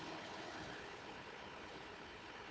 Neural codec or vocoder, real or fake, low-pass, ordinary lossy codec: codec, 16 kHz, 4 kbps, FunCodec, trained on Chinese and English, 50 frames a second; fake; none; none